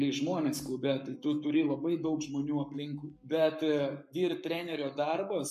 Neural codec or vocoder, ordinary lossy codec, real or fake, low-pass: codec, 44.1 kHz, 7.8 kbps, Pupu-Codec; MP3, 64 kbps; fake; 14.4 kHz